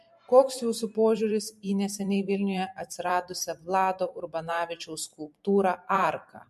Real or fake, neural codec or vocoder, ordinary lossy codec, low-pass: fake; vocoder, 44.1 kHz, 128 mel bands every 512 samples, BigVGAN v2; MP3, 64 kbps; 14.4 kHz